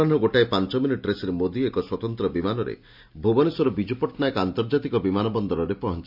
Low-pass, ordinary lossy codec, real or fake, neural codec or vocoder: 5.4 kHz; MP3, 48 kbps; real; none